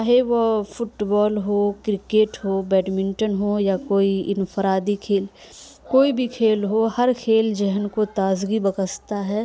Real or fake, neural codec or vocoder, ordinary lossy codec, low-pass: real; none; none; none